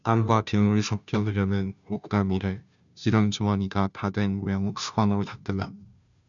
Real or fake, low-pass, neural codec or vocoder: fake; 7.2 kHz; codec, 16 kHz, 0.5 kbps, FunCodec, trained on Chinese and English, 25 frames a second